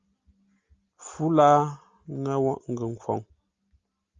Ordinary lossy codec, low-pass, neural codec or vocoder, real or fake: Opus, 32 kbps; 7.2 kHz; none; real